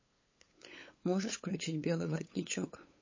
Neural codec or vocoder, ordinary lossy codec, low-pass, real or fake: codec, 16 kHz, 8 kbps, FunCodec, trained on LibriTTS, 25 frames a second; MP3, 32 kbps; 7.2 kHz; fake